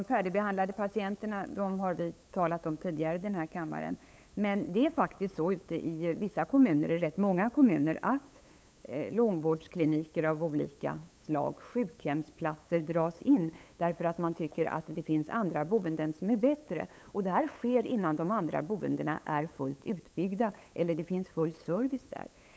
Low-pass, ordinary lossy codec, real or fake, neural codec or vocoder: none; none; fake; codec, 16 kHz, 8 kbps, FunCodec, trained on LibriTTS, 25 frames a second